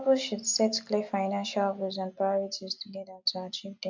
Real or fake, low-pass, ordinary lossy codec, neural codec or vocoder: real; 7.2 kHz; MP3, 64 kbps; none